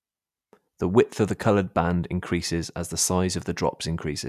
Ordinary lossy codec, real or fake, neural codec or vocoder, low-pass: none; real; none; 14.4 kHz